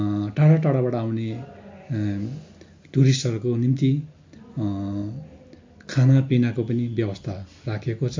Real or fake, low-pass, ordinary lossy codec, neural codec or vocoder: real; 7.2 kHz; MP3, 48 kbps; none